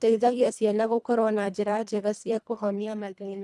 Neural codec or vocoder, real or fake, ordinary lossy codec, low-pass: codec, 24 kHz, 1.5 kbps, HILCodec; fake; none; none